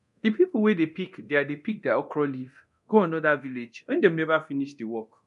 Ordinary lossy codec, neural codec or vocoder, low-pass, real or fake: none; codec, 24 kHz, 0.9 kbps, DualCodec; 10.8 kHz; fake